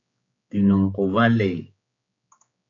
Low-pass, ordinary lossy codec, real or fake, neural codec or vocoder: 7.2 kHz; AAC, 64 kbps; fake; codec, 16 kHz, 4 kbps, X-Codec, HuBERT features, trained on general audio